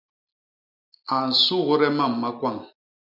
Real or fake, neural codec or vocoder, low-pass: real; none; 5.4 kHz